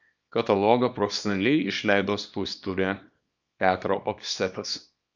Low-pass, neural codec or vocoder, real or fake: 7.2 kHz; codec, 24 kHz, 0.9 kbps, WavTokenizer, small release; fake